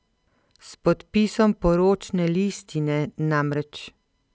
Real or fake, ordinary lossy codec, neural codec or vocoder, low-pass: real; none; none; none